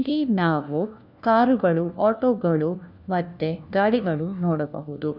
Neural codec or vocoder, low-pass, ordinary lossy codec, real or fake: codec, 16 kHz, 1 kbps, FunCodec, trained on LibriTTS, 50 frames a second; 5.4 kHz; none; fake